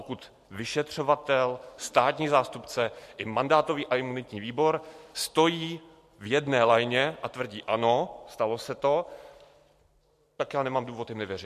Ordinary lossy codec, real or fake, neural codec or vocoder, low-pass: MP3, 64 kbps; real; none; 14.4 kHz